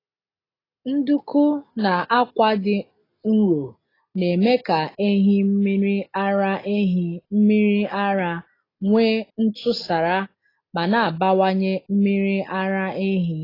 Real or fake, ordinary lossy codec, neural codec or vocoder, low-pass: real; AAC, 24 kbps; none; 5.4 kHz